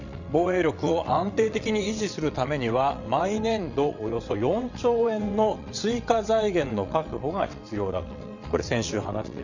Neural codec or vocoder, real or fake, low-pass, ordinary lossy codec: vocoder, 22.05 kHz, 80 mel bands, WaveNeXt; fake; 7.2 kHz; none